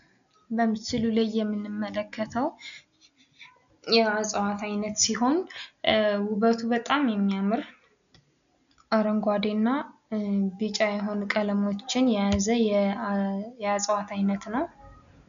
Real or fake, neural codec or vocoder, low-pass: real; none; 7.2 kHz